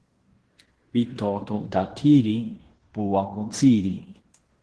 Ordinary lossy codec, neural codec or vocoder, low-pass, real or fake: Opus, 16 kbps; codec, 16 kHz in and 24 kHz out, 0.9 kbps, LongCat-Audio-Codec, fine tuned four codebook decoder; 10.8 kHz; fake